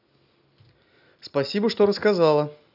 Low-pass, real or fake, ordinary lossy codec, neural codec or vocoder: 5.4 kHz; real; none; none